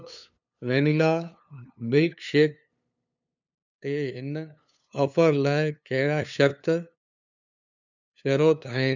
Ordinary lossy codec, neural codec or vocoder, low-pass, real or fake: none; codec, 16 kHz, 2 kbps, FunCodec, trained on LibriTTS, 25 frames a second; 7.2 kHz; fake